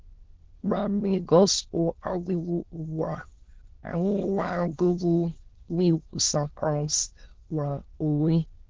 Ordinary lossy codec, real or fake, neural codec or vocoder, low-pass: Opus, 16 kbps; fake; autoencoder, 22.05 kHz, a latent of 192 numbers a frame, VITS, trained on many speakers; 7.2 kHz